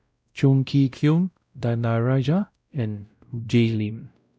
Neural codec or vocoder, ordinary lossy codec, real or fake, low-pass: codec, 16 kHz, 0.5 kbps, X-Codec, WavLM features, trained on Multilingual LibriSpeech; none; fake; none